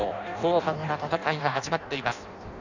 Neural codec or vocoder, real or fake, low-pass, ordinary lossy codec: codec, 16 kHz in and 24 kHz out, 0.6 kbps, FireRedTTS-2 codec; fake; 7.2 kHz; none